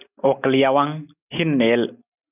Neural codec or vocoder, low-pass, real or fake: none; 3.6 kHz; real